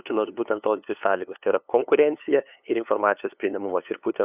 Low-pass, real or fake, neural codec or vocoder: 3.6 kHz; fake; codec, 16 kHz, 2 kbps, FunCodec, trained on LibriTTS, 25 frames a second